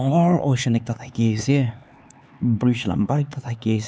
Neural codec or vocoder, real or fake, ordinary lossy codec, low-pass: codec, 16 kHz, 4 kbps, X-Codec, HuBERT features, trained on LibriSpeech; fake; none; none